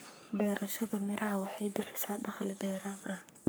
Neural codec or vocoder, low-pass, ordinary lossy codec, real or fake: codec, 44.1 kHz, 3.4 kbps, Pupu-Codec; none; none; fake